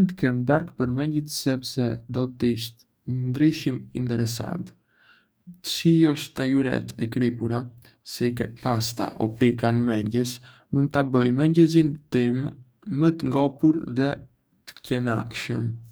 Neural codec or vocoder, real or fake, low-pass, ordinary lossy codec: codec, 44.1 kHz, 2.6 kbps, DAC; fake; none; none